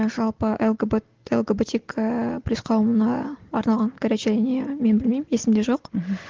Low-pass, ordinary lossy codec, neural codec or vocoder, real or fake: 7.2 kHz; Opus, 16 kbps; none; real